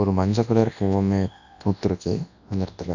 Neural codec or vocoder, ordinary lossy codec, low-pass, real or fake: codec, 24 kHz, 0.9 kbps, WavTokenizer, large speech release; none; 7.2 kHz; fake